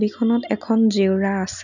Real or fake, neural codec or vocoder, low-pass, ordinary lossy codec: real; none; 7.2 kHz; none